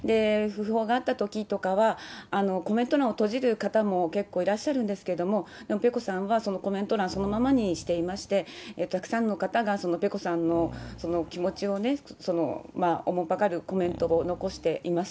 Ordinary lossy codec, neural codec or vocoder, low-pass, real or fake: none; none; none; real